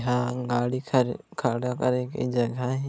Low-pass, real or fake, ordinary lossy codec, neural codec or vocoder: none; real; none; none